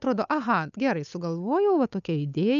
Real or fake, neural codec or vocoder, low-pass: fake; codec, 16 kHz, 8 kbps, FunCodec, trained on Chinese and English, 25 frames a second; 7.2 kHz